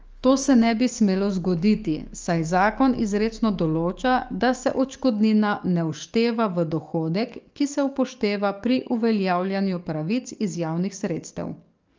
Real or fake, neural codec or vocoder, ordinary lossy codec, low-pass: fake; codec, 44.1 kHz, 7.8 kbps, DAC; Opus, 24 kbps; 7.2 kHz